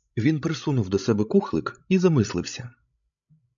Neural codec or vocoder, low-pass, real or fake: codec, 16 kHz, 16 kbps, FreqCodec, larger model; 7.2 kHz; fake